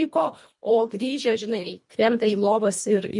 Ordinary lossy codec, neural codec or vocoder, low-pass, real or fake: MP3, 48 kbps; codec, 24 kHz, 1.5 kbps, HILCodec; 10.8 kHz; fake